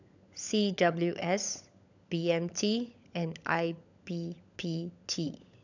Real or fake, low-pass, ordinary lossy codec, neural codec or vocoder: fake; 7.2 kHz; none; codec, 16 kHz, 16 kbps, FunCodec, trained on LibriTTS, 50 frames a second